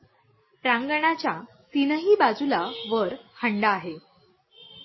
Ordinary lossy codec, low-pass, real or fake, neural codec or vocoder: MP3, 24 kbps; 7.2 kHz; real; none